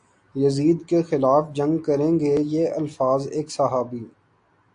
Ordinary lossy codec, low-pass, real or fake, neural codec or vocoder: AAC, 64 kbps; 9.9 kHz; real; none